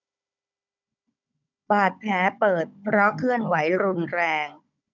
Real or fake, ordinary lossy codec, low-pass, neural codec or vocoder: fake; none; 7.2 kHz; codec, 16 kHz, 16 kbps, FunCodec, trained on Chinese and English, 50 frames a second